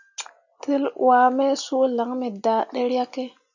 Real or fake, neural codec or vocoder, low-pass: real; none; 7.2 kHz